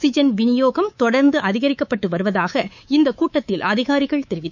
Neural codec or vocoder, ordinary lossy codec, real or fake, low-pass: codec, 24 kHz, 3.1 kbps, DualCodec; none; fake; 7.2 kHz